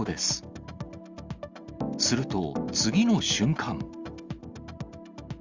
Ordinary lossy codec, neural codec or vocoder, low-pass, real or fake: Opus, 32 kbps; none; 7.2 kHz; real